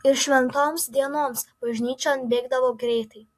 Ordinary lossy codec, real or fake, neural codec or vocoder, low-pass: AAC, 64 kbps; real; none; 14.4 kHz